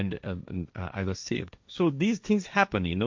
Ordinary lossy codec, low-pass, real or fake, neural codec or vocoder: MP3, 64 kbps; 7.2 kHz; fake; codec, 16 kHz, 1.1 kbps, Voila-Tokenizer